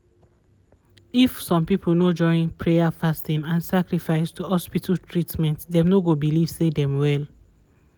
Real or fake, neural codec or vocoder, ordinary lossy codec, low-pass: real; none; none; none